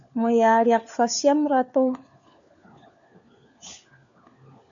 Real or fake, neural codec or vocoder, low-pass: fake; codec, 16 kHz, 4 kbps, FunCodec, trained on LibriTTS, 50 frames a second; 7.2 kHz